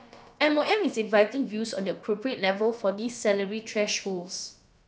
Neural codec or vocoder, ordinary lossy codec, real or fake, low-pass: codec, 16 kHz, about 1 kbps, DyCAST, with the encoder's durations; none; fake; none